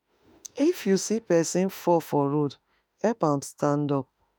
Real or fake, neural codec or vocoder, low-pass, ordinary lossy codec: fake; autoencoder, 48 kHz, 32 numbers a frame, DAC-VAE, trained on Japanese speech; none; none